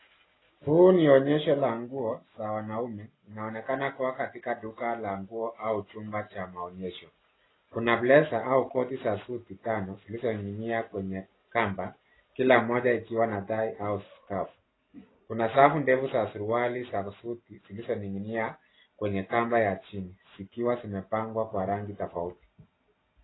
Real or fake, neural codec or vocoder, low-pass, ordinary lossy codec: real; none; 7.2 kHz; AAC, 16 kbps